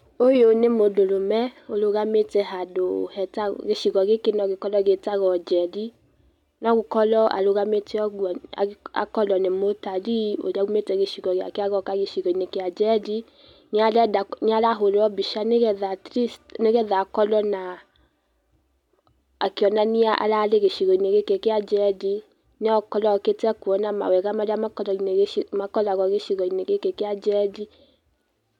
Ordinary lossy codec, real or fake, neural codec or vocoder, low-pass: none; real; none; 19.8 kHz